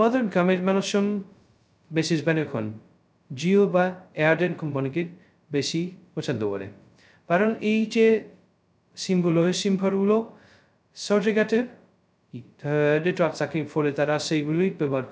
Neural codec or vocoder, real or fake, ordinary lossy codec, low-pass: codec, 16 kHz, 0.2 kbps, FocalCodec; fake; none; none